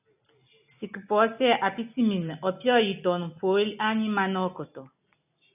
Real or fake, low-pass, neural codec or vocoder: real; 3.6 kHz; none